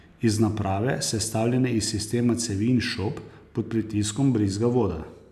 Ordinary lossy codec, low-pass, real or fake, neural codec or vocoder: none; 14.4 kHz; real; none